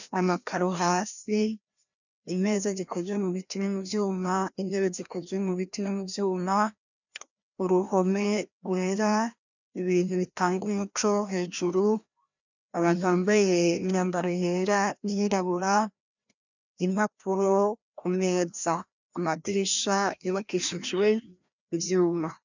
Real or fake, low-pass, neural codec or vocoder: fake; 7.2 kHz; codec, 16 kHz, 1 kbps, FreqCodec, larger model